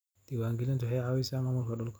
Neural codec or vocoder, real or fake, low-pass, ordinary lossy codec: none; real; none; none